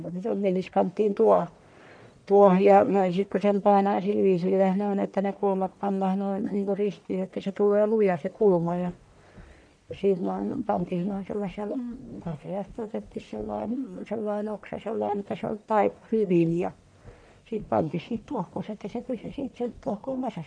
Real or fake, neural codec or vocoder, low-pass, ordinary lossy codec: fake; codec, 44.1 kHz, 1.7 kbps, Pupu-Codec; 9.9 kHz; none